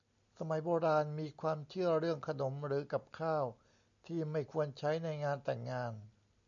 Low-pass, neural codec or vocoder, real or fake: 7.2 kHz; none; real